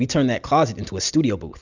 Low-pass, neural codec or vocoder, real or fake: 7.2 kHz; none; real